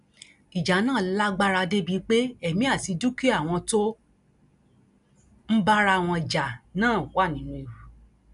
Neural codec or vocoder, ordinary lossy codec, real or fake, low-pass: none; none; real; 10.8 kHz